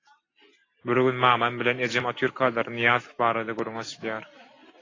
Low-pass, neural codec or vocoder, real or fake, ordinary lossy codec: 7.2 kHz; none; real; AAC, 32 kbps